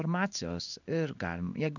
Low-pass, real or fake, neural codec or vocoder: 7.2 kHz; fake; codec, 16 kHz in and 24 kHz out, 1 kbps, XY-Tokenizer